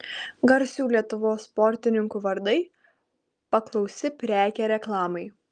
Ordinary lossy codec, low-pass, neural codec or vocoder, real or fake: Opus, 32 kbps; 9.9 kHz; none; real